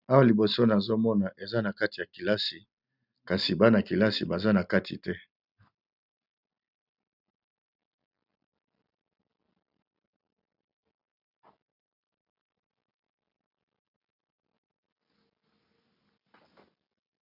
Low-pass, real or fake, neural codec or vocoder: 5.4 kHz; real; none